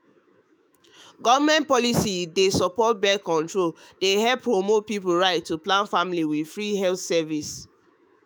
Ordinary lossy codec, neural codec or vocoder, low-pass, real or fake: none; autoencoder, 48 kHz, 128 numbers a frame, DAC-VAE, trained on Japanese speech; none; fake